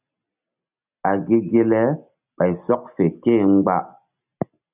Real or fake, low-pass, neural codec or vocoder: real; 3.6 kHz; none